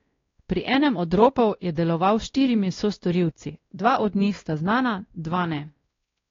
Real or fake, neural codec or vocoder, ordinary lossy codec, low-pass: fake; codec, 16 kHz, 1 kbps, X-Codec, WavLM features, trained on Multilingual LibriSpeech; AAC, 32 kbps; 7.2 kHz